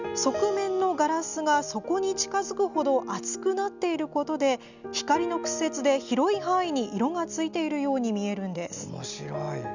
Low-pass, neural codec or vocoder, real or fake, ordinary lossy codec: 7.2 kHz; none; real; none